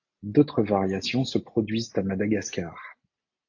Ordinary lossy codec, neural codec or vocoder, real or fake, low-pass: AAC, 48 kbps; none; real; 7.2 kHz